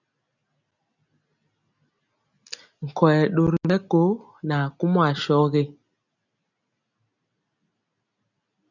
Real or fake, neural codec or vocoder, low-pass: real; none; 7.2 kHz